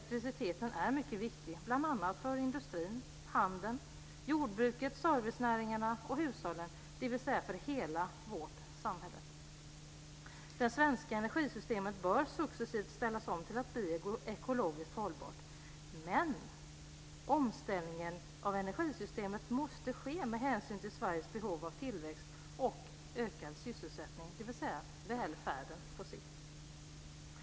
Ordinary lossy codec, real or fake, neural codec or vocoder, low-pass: none; real; none; none